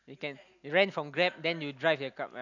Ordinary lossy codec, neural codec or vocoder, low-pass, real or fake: none; none; 7.2 kHz; real